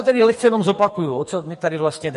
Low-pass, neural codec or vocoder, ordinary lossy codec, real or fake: 14.4 kHz; codec, 44.1 kHz, 2.6 kbps, SNAC; MP3, 48 kbps; fake